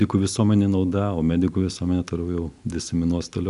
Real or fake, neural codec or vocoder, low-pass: real; none; 10.8 kHz